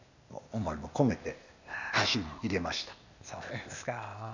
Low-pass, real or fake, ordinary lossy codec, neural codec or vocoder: 7.2 kHz; fake; none; codec, 16 kHz, 0.8 kbps, ZipCodec